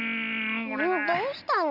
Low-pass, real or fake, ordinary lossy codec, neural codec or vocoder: 5.4 kHz; real; Opus, 24 kbps; none